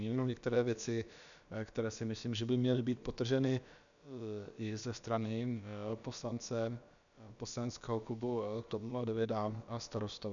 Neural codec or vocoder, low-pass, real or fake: codec, 16 kHz, about 1 kbps, DyCAST, with the encoder's durations; 7.2 kHz; fake